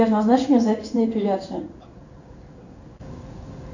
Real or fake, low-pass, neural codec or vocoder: fake; 7.2 kHz; codec, 16 kHz in and 24 kHz out, 1 kbps, XY-Tokenizer